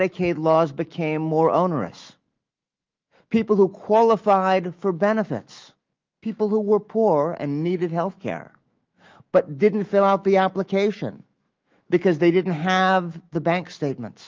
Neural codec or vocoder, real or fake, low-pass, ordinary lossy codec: autoencoder, 48 kHz, 128 numbers a frame, DAC-VAE, trained on Japanese speech; fake; 7.2 kHz; Opus, 32 kbps